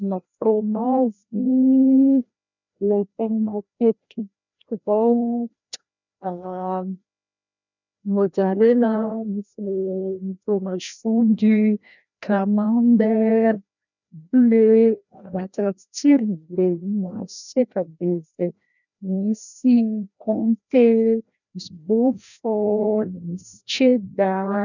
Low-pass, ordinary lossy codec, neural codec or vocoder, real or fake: 7.2 kHz; none; codec, 16 kHz, 1 kbps, FreqCodec, larger model; fake